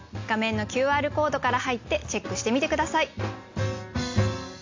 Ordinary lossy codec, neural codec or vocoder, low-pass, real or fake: AAC, 48 kbps; none; 7.2 kHz; real